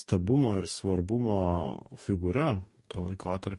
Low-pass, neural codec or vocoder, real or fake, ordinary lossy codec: 14.4 kHz; codec, 44.1 kHz, 2.6 kbps, DAC; fake; MP3, 48 kbps